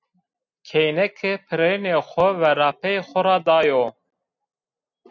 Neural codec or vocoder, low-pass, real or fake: none; 7.2 kHz; real